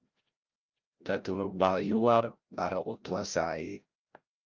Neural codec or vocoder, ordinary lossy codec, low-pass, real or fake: codec, 16 kHz, 0.5 kbps, FreqCodec, larger model; Opus, 24 kbps; 7.2 kHz; fake